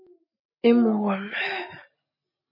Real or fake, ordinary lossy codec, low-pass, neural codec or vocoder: real; MP3, 24 kbps; 5.4 kHz; none